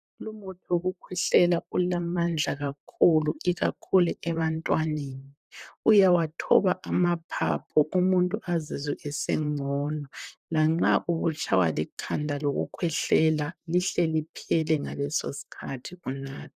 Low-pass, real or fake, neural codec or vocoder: 14.4 kHz; fake; vocoder, 44.1 kHz, 128 mel bands, Pupu-Vocoder